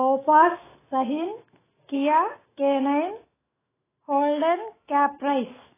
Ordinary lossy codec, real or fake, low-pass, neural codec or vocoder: AAC, 16 kbps; fake; 3.6 kHz; autoencoder, 48 kHz, 128 numbers a frame, DAC-VAE, trained on Japanese speech